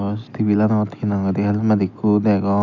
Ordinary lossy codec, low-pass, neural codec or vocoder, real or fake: none; 7.2 kHz; none; real